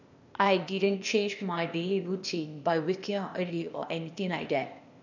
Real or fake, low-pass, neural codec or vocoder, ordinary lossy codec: fake; 7.2 kHz; codec, 16 kHz, 0.8 kbps, ZipCodec; none